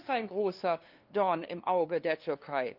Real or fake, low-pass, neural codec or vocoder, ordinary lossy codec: fake; 5.4 kHz; codec, 16 kHz, 2 kbps, FunCodec, trained on LibriTTS, 25 frames a second; Opus, 32 kbps